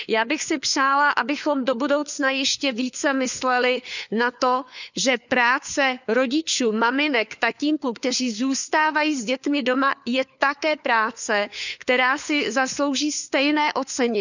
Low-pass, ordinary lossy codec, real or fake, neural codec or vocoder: 7.2 kHz; none; fake; codec, 16 kHz, 4 kbps, FunCodec, trained on LibriTTS, 50 frames a second